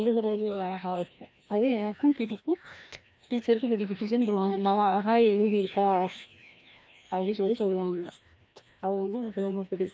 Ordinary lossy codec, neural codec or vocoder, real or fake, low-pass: none; codec, 16 kHz, 1 kbps, FreqCodec, larger model; fake; none